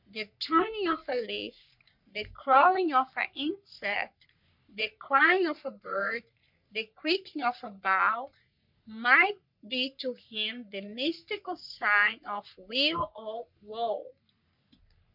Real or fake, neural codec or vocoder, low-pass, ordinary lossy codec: fake; codec, 44.1 kHz, 3.4 kbps, Pupu-Codec; 5.4 kHz; MP3, 48 kbps